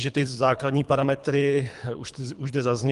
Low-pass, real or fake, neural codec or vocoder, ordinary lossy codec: 10.8 kHz; fake; codec, 24 kHz, 3 kbps, HILCodec; Opus, 32 kbps